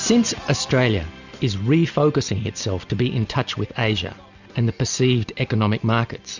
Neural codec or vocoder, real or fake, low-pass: none; real; 7.2 kHz